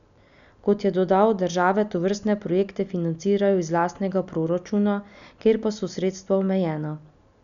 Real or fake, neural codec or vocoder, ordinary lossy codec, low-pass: real; none; none; 7.2 kHz